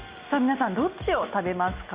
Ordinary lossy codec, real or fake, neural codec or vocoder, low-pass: Opus, 64 kbps; real; none; 3.6 kHz